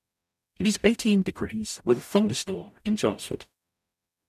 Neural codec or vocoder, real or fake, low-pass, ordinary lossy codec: codec, 44.1 kHz, 0.9 kbps, DAC; fake; 14.4 kHz; none